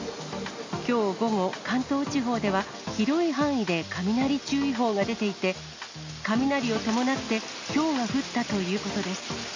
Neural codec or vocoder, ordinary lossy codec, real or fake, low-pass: none; MP3, 48 kbps; real; 7.2 kHz